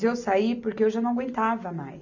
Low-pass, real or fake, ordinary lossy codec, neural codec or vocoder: 7.2 kHz; real; none; none